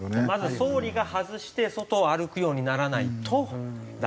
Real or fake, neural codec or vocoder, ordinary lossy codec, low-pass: real; none; none; none